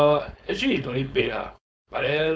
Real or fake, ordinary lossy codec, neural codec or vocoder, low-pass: fake; none; codec, 16 kHz, 4.8 kbps, FACodec; none